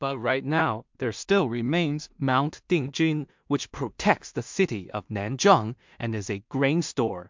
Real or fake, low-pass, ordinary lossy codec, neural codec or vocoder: fake; 7.2 kHz; MP3, 64 kbps; codec, 16 kHz in and 24 kHz out, 0.4 kbps, LongCat-Audio-Codec, two codebook decoder